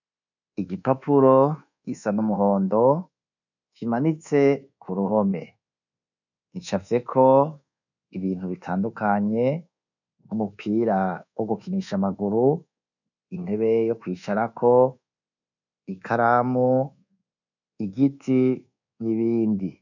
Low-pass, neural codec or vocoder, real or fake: 7.2 kHz; codec, 24 kHz, 1.2 kbps, DualCodec; fake